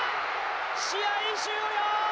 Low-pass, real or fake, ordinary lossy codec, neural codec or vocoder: none; real; none; none